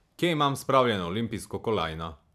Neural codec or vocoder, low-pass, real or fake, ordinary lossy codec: vocoder, 44.1 kHz, 128 mel bands every 512 samples, BigVGAN v2; 14.4 kHz; fake; none